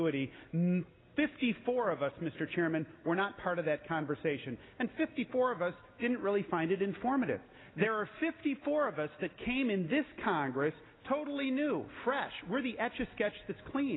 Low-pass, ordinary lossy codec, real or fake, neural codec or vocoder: 7.2 kHz; AAC, 16 kbps; real; none